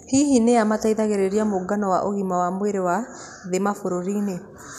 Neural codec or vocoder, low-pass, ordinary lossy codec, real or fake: none; 14.4 kHz; none; real